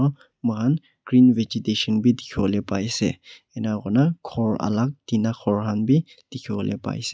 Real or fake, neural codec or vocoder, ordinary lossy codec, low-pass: real; none; none; none